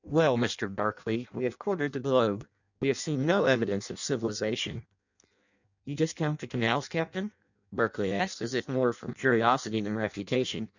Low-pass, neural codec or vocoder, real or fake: 7.2 kHz; codec, 16 kHz in and 24 kHz out, 0.6 kbps, FireRedTTS-2 codec; fake